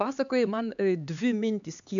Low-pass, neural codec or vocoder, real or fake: 7.2 kHz; codec, 16 kHz, 4 kbps, X-Codec, HuBERT features, trained on LibriSpeech; fake